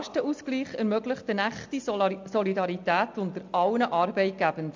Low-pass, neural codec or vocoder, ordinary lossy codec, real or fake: 7.2 kHz; none; MP3, 64 kbps; real